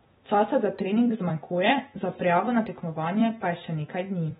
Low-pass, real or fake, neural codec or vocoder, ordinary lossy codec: 19.8 kHz; fake; vocoder, 44.1 kHz, 128 mel bands every 256 samples, BigVGAN v2; AAC, 16 kbps